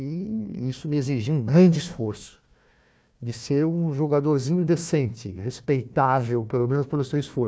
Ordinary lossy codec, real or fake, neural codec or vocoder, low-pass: none; fake; codec, 16 kHz, 1 kbps, FunCodec, trained on Chinese and English, 50 frames a second; none